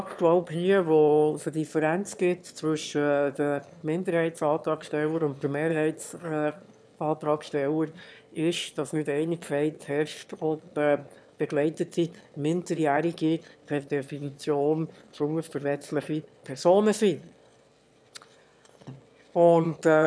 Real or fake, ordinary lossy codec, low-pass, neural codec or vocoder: fake; none; none; autoencoder, 22.05 kHz, a latent of 192 numbers a frame, VITS, trained on one speaker